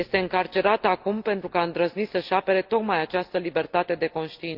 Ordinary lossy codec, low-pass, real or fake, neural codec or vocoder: Opus, 32 kbps; 5.4 kHz; real; none